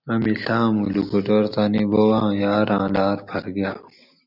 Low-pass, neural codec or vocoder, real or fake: 5.4 kHz; none; real